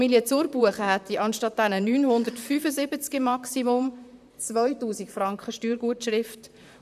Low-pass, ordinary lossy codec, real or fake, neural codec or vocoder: 14.4 kHz; none; fake; vocoder, 44.1 kHz, 128 mel bands, Pupu-Vocoder